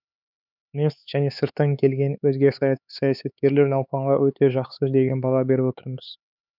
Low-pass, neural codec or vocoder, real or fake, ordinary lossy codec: 5.4 kHz; codec, 16 kHz, 4 kbps, X-Codec, HuBERT features, trained on LibriSpeech; fake; none